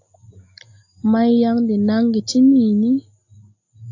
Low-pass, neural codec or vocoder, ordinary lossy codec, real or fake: 7.2 kHz; none; AAC, 48 kbps; real